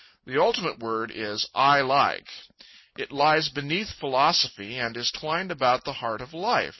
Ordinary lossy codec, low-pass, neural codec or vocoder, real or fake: MP3, 24 kbps; 7.2 kHz; none; real